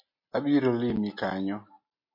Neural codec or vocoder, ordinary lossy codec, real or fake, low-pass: none; MP3, 32 kbps; real; 5.4 kHz